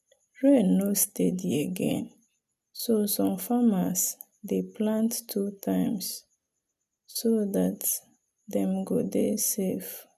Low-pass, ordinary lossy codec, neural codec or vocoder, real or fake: 14.4 kHz; none; none; real